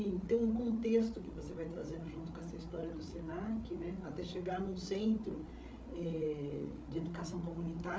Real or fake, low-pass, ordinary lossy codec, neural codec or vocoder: fake; none; none; codec, 16 kHz, 8 kbps, FreqCodec, larger model